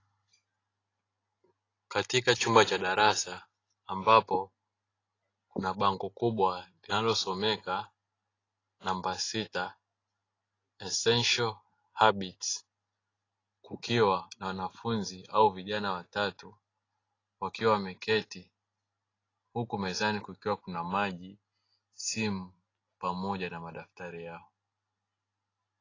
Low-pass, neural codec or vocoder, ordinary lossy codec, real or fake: 7.2 kHz; none; AAC, 32 kbps; real